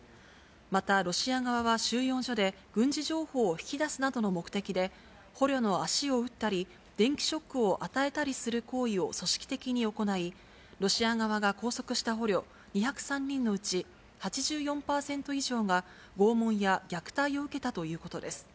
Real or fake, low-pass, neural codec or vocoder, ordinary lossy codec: real; none; none; none